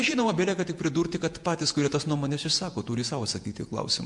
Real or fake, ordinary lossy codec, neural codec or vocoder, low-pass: fake; MP3, 64 kbps; vocoder, 48 kHz, 128 mel bands, Vocos; 10.8 kHz